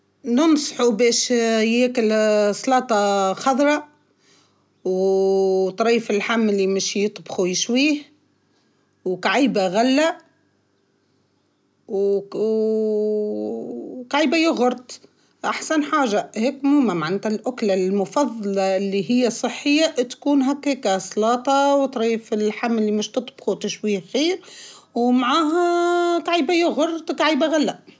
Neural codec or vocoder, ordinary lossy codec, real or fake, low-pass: none; none; real; none